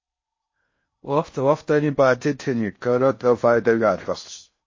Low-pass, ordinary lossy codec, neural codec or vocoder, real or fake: 7.2 kHz; MP3, 32 kbps; codec, 16 kHz in and 24 kHz out, 0.6 kbps, FocalCodec, streaming, 4096 codes; fake